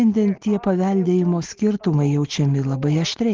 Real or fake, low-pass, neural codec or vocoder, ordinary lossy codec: real; 7.2 kHz; none; Opus, 16 kbps